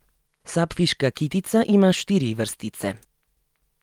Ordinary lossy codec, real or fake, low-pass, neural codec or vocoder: Opus, 32 kbps; real; 19.8 kHz; none